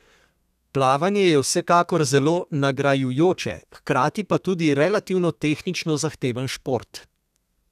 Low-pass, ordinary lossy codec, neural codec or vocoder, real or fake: 14.4 kHz; none; codec, 32 kHz, 1.9 kbps, SNAC; fake